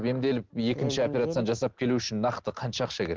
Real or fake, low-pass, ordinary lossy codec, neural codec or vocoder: real; 7.2 kHz; Opus, 16 kbps; none